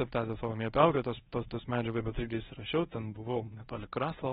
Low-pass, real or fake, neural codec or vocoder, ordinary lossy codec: 10.8 kHz; fake; codec, 24 kHz, 0.9 kbps, WavTokenizer, medium speech release version 1; AAC, 16 kbps